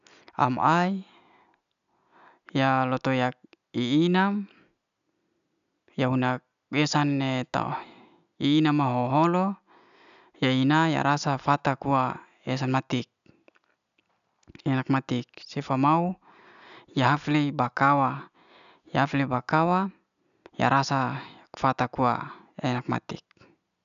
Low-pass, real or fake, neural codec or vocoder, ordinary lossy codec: 7.2 kHz; real; none; none